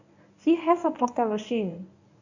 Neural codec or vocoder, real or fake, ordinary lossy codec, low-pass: codec, 24 kHz, 0.9 kbps, WavTokenizer, medium speech release version 1; fake; none; 7.2 kHz